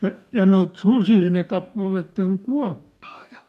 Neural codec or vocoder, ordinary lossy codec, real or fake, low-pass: codec, 44.1 kHz, 2.6 kbps, DAC; MP3, 96 kbps; fake; 14.4 kHz